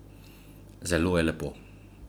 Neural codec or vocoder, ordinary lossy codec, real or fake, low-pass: none; none; real; none